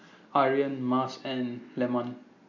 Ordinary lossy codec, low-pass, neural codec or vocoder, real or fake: AAC, 48 kbps; 7.2 kHz; none; real